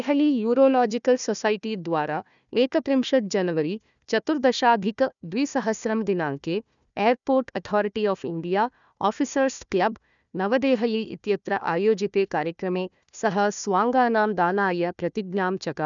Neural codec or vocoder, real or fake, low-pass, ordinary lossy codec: codec, 16 kHz, 1 kbps, FunCodec, trained on Chinese and English, 50 frames a second; fake; 7.2 kHz; none